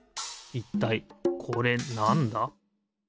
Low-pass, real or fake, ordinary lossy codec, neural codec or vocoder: none; real; none; none